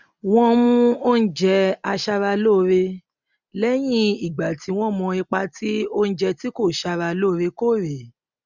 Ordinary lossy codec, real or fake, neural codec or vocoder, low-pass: none; real; none; 7.2 kHz